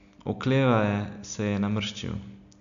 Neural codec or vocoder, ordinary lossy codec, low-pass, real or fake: none; none; 7.2 kHz; real